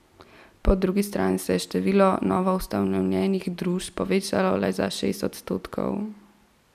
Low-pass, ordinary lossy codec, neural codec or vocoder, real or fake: 14.4 kHz; none; none; real